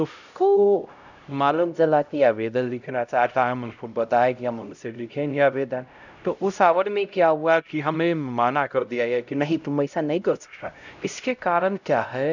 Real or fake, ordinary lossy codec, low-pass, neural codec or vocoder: fake; none; 7.2 kHz; codec, 16 kHz, 0.5 kbps, X-Codec, HuBERT features, trained on LibriSpeech